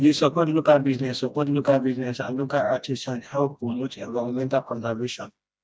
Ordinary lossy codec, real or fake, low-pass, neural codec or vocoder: none; fake; none; codec, 16 kHz, 1 kbps, FreqCodec, smaller model